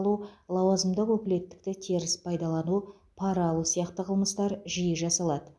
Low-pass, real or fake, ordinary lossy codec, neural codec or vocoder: none; real; none; none